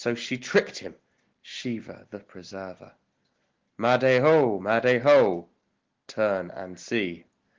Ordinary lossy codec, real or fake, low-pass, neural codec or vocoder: Opus, 16 kbps; real; 7.2 kHz; none